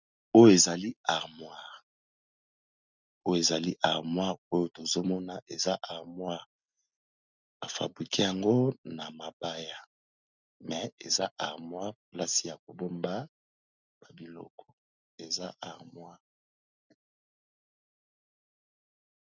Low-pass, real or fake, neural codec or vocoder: 7.2 kHz; real; none